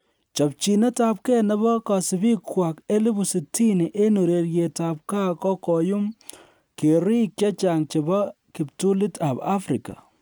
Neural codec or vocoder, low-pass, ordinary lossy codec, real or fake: none; none; none; real